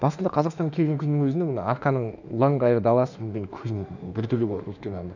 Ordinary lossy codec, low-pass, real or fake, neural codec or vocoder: none; 7.2 kHz; fake; autoencoder, 48 kHz, 32 numbers a frame, DAC-VAE, trained on Japanese speech